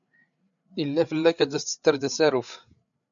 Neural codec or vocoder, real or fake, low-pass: codec, 16 kHz, 8 kbps, FreqCodec, larger model; fake; 7.2 kHz